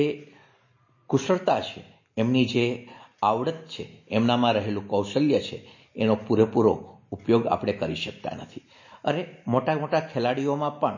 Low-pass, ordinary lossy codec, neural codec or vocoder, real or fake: 7.2 kHz; MP3, 32 kbps; none; real